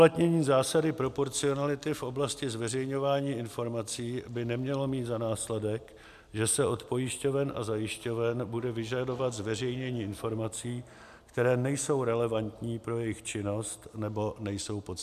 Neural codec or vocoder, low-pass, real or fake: none; 14.4 kHz; real